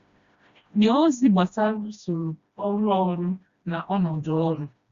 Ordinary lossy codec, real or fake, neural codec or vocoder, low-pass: Opus, 64 kbps; fake; codec, 16 kHz, 1 kbps, FreqCodec, smaller model; 7.2 kHz